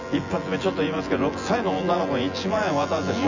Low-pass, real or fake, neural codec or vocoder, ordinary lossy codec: 7.2 kHz; fake; vocoder, 24 kHz, 100 mel bands, Vocos; none